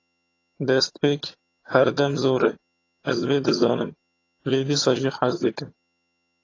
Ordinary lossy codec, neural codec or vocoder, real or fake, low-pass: AAC, 32 kbps; vocoder, 22.05 kHz, 80 mel bands, HiFi-GAN; fake; 7.2 kHz